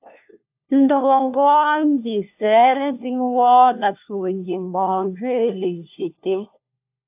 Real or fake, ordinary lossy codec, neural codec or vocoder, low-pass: fake; AAC, 32 kbps; codec, 16 kHz, 1 kbps, FunCodec, trained on LibriTTS, 50 frames a second; 3.6 kHz